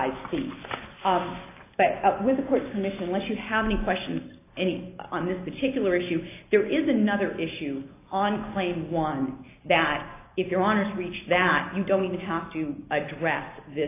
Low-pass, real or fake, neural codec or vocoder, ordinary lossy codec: 3.6 kHz; real; none; AAC, 24 kbps